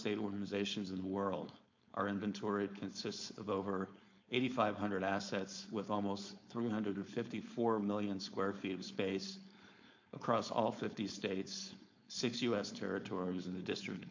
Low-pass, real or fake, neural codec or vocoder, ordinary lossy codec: 7.2 kHz; fake; codec, 16 kHz, 4.8 kbps, FACodec; MP3, 48 kbps